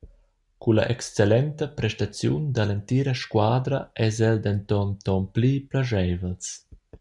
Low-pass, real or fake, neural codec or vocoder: 10.8 kHz; real; none